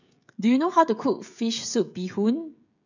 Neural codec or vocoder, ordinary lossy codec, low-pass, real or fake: codec, 16 kHz, 16 kbps, FreqCodec, smaller model; none; 7.2 kHz; fake